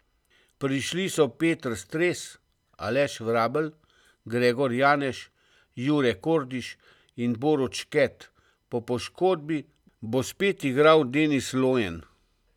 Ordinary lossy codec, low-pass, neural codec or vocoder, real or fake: none; 19.8 kHz; none; real